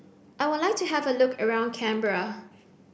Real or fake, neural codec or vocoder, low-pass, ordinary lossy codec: real; none; none; none